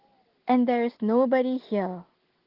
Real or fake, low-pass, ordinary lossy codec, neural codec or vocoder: real; 5.4 kHz; Opus, 16 kbps; none